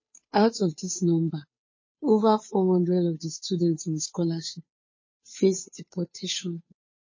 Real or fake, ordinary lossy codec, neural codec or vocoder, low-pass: fake; MP3, 32 kbps; codec, 16 kHz, 2 kbps, FunCodec, trained on Chinese and English, 25 frames a second; 7.2 kHz